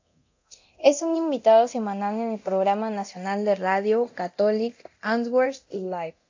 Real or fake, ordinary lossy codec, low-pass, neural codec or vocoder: fake; AAC, 48 kbps; 7.2 kHz; codec, 24 kHz, 0.9 kbps, DualCodec